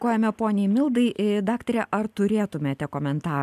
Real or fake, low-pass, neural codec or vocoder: real; 14.4 kHz; none